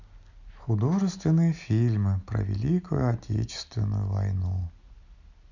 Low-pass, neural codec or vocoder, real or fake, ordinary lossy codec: 7.2 kHz; none; real; none